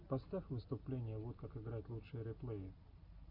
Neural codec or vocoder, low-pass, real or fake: none; 5.4 kHz; real